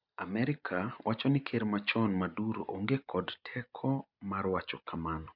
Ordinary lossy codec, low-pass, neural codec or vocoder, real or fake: none; 5.4 kHz; none; real